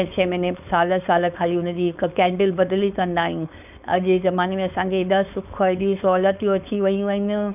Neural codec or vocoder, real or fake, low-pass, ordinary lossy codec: codec, 16 kHz, 4.8 kbps, FACodec; fake; 3.6 kHz; none